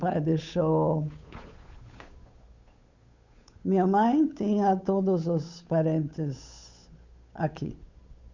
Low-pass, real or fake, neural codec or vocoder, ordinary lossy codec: 7.2 kHz; fake; codec, 16 kHz, 8 kbps, FunCodec, trained on Chinese and English, 25 frames a second; Opus, 64 kbps